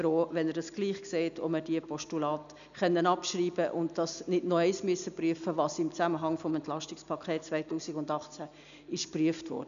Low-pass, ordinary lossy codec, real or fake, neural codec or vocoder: 7.2 kHz; none; real; none